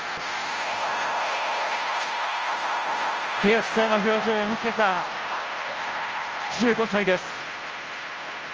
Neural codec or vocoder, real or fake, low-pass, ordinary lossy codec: codec, 16 kHz, 0.5 kbps, FunCodec, trained on Chinese and English, 25 frames a second; fake; 7.2 kHz; Opus, 24 kbps